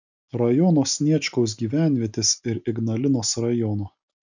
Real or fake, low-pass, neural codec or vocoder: real; 7.2 kHz; none